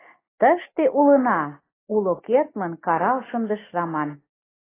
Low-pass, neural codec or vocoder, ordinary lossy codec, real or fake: 3.6 kHz; none; AAC, 16 kbps; real